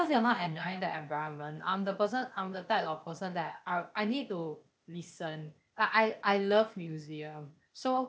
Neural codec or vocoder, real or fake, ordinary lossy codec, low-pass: codec, 16 kHz, 0.8 kbps, ZipCodec; fake; none; none